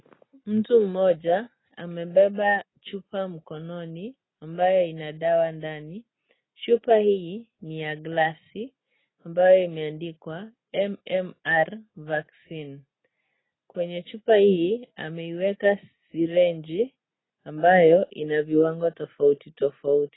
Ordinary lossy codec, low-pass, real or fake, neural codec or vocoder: AAC, 16 kbps; 7.2 kHz; real; none